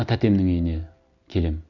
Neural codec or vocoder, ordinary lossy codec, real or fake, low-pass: none; none; real; 7.2 kHz